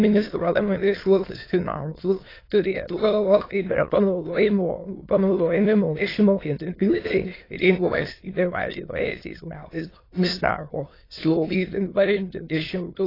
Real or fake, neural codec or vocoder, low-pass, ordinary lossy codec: fake; autoencoder, 22.05 kHz, a latent of 192 numbers a frame, VITS, trained on many speakers; 5.4 kHz; AAC, 24 kbps